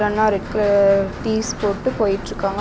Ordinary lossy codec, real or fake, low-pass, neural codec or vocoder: none; real; none; none